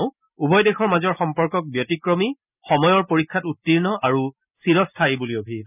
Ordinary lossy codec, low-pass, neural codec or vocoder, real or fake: none; 3.6 kHz; none; real